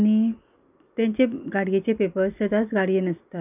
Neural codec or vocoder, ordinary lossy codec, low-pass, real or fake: none; none; 3.6 kHz; real